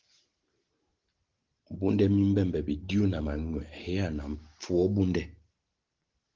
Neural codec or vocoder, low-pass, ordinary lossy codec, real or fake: none; 7.2 kHz; Opus, 16 kbps; real